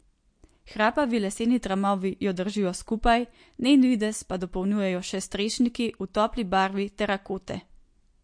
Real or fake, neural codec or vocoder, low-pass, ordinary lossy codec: real; none; 9.9 kHz; MP3, 48 kbps